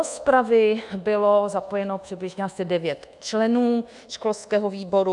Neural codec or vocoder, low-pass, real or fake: codec, 24 kHz, 1.2 kbps, DualCodec; 10.8 kHz; fake